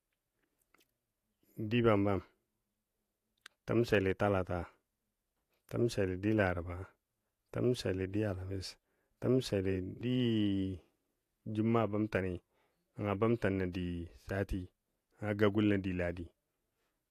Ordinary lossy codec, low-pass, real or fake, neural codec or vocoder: AAC, 64 kbps; 14.4 kHz; fake; vocoder, 48 kHz, 128 mel bands, Vocos